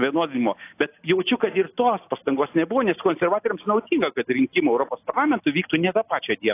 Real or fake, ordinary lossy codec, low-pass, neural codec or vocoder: real; AAC, 24 kbps; 3.6 kHz; none